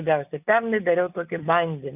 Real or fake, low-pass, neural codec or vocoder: real; 3.6 kHz; none